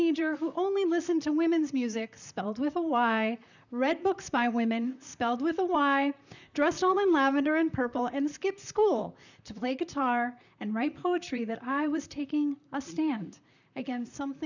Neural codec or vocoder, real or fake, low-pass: vocoder, 44.1 kHz, 128 mel bands, Pupu-Vocoder; fake; 7.2 kHz